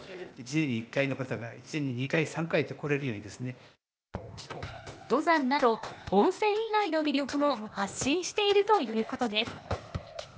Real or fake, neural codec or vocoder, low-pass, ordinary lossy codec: fake; codec, 16 kHz, 0.8 kbps, ZipCodec; none; none